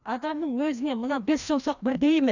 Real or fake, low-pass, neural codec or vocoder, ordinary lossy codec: fake; 7.2 kHz; codec, 16 kHz, 1 kbps, FreqCodec, larger model; none